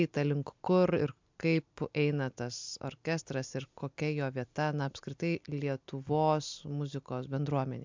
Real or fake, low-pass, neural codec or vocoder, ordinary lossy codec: real; 7.2 kHz; none; MP3, 64 kbps